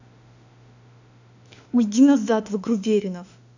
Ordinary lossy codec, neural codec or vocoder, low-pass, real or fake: none; autoencoder, 48 kHz, 32 numbers a frame, DAC-VAE, trained on Japanese speech; 7.2 kHz; fake